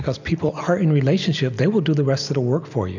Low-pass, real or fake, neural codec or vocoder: 7.2 kHz; real; none